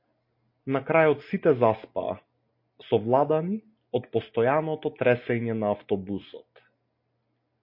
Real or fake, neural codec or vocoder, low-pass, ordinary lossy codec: real; none; 5.4 kHz; MP3, 32 kbps